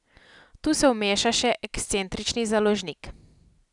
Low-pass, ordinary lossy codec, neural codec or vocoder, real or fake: 10.8 kHz; none; none; real